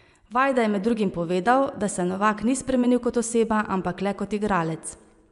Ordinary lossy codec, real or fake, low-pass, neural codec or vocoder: MP3, 96 kbps; fake; 10.8 kHz; vocoder, 24 kHz, 100 mel bands, Vocos